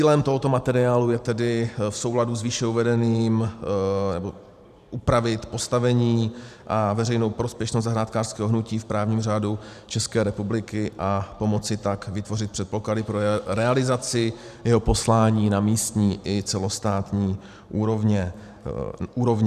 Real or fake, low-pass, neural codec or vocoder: fake; 14.4 kHz; vocoder, 44.1 kHz, 128 mel bands every 512 samples, BigVGAN v2